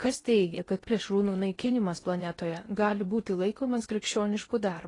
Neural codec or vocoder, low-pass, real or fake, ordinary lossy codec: codec, 16 kHz in and 24 kHz out, 0.8 kbps, FocalCodec, streaming, 65536 codes; 10.8 kHz; fake; AAC, 32 kbps